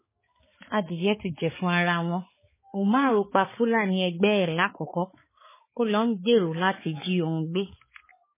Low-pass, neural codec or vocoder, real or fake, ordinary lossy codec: 3.6 kHz; codec, 16 kHz, 4 kbps, X-Codec, HuBERT features, trained on balanced general audio; fake; MP3, 16 kbps